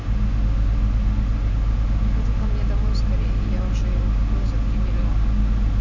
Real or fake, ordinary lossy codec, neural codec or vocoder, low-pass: real; none; none; 7.2 kHz